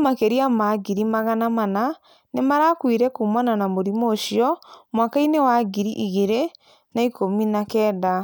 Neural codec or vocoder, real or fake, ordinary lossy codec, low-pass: none; real; none; none